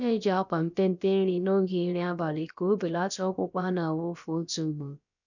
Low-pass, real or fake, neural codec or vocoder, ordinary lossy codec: 7.2 kHz; fake; codec, 16 kHz, about 1 kbps, DyCAST, with the encoder's durations; none